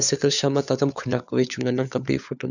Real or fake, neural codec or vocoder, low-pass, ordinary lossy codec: fake; codec, 16 kHz, 4 kbps, FunCodec, trained on LibriTTS, 50 frames a second; 7.2 kHz; none